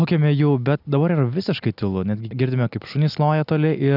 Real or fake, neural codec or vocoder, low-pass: real; none; 5.4 kHz